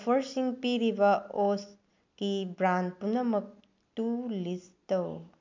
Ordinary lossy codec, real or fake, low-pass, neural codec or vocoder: MP3, 64 kbps; real; 7.2 kHz; none